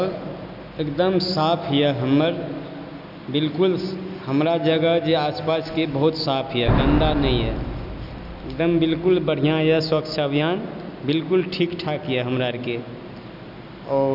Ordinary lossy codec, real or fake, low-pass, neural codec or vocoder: none; real; 5.4 kHz; none